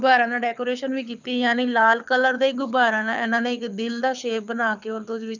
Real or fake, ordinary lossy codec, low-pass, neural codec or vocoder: fake; none; 7.2 kHz; codec, 24 kHz, 6 kbps, HILCodec